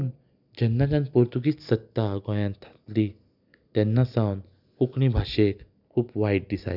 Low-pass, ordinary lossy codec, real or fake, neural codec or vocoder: 5.4 kHz; none; real; none